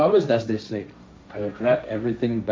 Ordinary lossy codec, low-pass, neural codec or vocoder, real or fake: none; none; codec, 16 kHz, 1.1 kbps, Voila-Tokenizer; fake